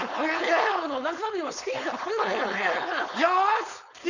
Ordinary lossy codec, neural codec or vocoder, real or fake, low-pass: none; codec, 16 kHz, 4.8 kbps, FACodec; fake; 7.2 kHz